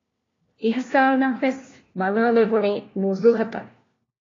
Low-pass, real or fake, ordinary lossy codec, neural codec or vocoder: 7.2 kHz; fake; AAC, 32 kbps; codec, 16 kHz, 1 kbps, FunCodec, trained on LibriTTS, 50 frames a second